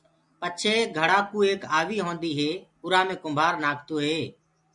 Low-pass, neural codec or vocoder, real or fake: 10.8 kHz; none; real